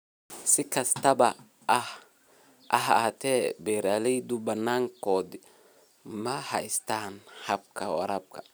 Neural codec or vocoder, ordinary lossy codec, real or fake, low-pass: none; none; real; none